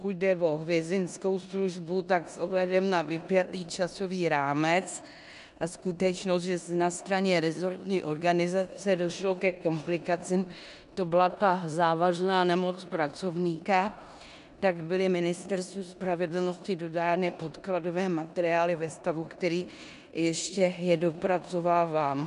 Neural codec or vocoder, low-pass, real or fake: codec, 16 kHz in and 24 kHz out, 0.9 kbps, LongCat-Audio-Codec, four codebook decoder; 10.8 kHz; fake